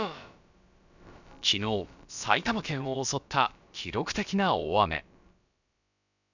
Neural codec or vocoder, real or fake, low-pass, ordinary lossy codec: codec, 16 kHz, about 1 kbps, DyCAST, with the encoder's durations; fake; 7.2 kHz; none